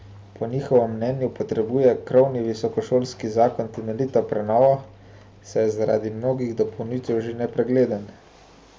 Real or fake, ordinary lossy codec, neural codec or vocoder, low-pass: real; none; none; none